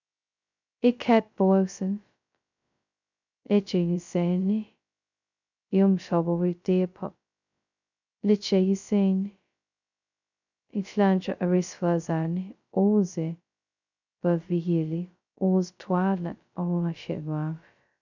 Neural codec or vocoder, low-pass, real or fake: codec, 16 kHz, 0.2 kbps, FocalCodec; 7.2 kHz; fake